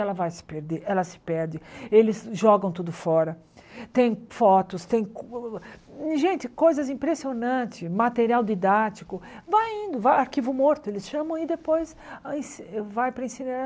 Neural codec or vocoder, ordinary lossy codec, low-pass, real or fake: none; none; none; real